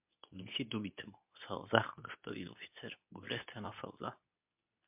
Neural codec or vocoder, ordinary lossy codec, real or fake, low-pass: codec, 24 kHz, 0.9 kbps, WavTokenizer, medium speech release version 2; MP3, 32 kbps; fake; 3.6 kHz